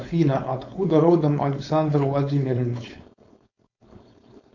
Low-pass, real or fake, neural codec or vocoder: 7.2 kHz; fake; codec, 16 kHz, 4.8 kbps, FACodec